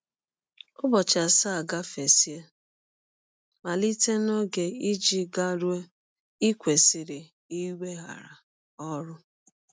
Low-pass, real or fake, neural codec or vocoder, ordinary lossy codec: none; real; none; none